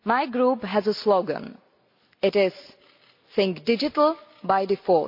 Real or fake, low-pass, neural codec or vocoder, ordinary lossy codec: real; 5.4 kHz; none; MP3, 32 kbps